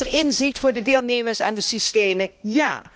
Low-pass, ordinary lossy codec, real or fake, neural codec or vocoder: none; none; fake; codec, 16 kHz, 1 kbps, X-Codec, HuBERT features, trained on balanced general audio